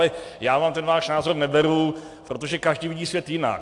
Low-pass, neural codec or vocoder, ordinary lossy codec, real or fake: 10.8 kHz; none; AAC, 64 kbps; real